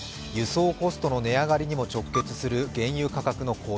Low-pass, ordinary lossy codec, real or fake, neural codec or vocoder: none; none; real; none